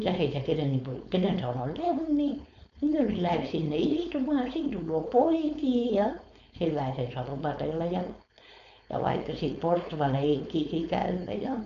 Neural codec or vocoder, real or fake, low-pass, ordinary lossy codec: codec, 16 kHz, 4.8 kbps, FACodec; fake; 7.2 kHz; none